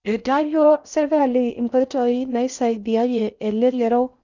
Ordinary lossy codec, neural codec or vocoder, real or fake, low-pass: none; codec, 16 kHz in and 24 kHz out, 0.6 kbps, FocalCodec, streaming, 4096 codes; fake; 7.2 kHz